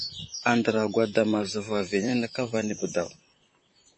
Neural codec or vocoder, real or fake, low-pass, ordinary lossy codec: vocoder, 44.1 kHz, 128 mel bands, Pupu-Vocoder; fake; 9.9 kHz; MP3, 32 kbps